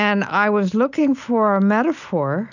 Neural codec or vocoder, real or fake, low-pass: none; real; 7.2 kHz